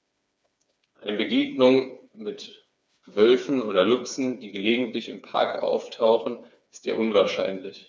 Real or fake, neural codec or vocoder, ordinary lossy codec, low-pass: fake; codec, 16 kHz, 4 kbps, FreqCodec, smaller model; none; none